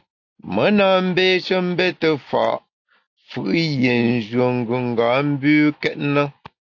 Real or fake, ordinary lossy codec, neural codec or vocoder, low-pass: real; AAC, 48 kbps; none; 7.2 kHz